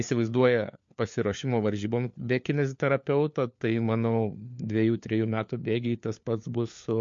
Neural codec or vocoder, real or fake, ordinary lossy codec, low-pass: codec, 16 kHz, 4 kbps, FunCodec, trained on LibriTTS, 50 frames a second; fake; MP3, 48 kbps; 7.2 kHz